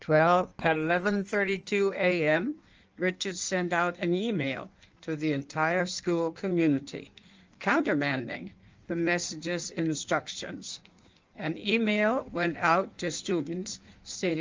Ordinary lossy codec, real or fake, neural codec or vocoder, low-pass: Opus, 24 kbps; fake; codec, 16 kHz in and 24 kHz out, 1.1 kbps, FireRedTTS-2 codec; 7.2 kHz